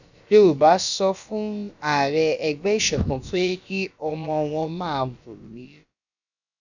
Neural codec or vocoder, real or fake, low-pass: codec, 16 kHz, about 1 kbps, DyCAST, with the encoder's durations; fake; 7.2 kHz